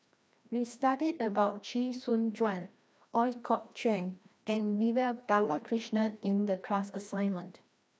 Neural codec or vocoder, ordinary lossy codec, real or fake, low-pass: codec, 16 kHz, 1 kbps, FreqCodec, larger model; none; fake; none